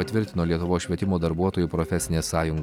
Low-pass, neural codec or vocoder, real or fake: 19.8 kHz; none; real